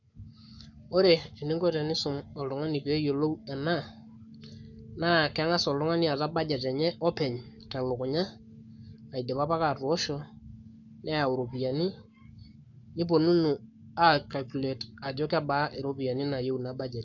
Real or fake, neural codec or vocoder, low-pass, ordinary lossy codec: fake; codec, 44.1 kHz, 7.8 kbps, Pupu-Codec; 7.2 kHz; none